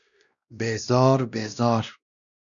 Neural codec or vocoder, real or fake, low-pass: codec, 16 kHz, 1 kbps, X-Codec, WavLM features, trained on Multilingual LibriSpeech; fake; 7.2 kHz